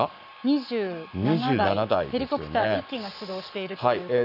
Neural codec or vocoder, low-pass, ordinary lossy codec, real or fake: codec, 44.1 kHz, 7.8 kbps, Pupu-Codec; 5.4 kHz; none; fake